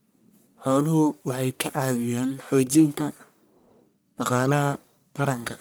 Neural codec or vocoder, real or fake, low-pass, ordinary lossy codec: codec, 44.1 kHz, 1.7 kbps, Pupu-Codec; fake; none; none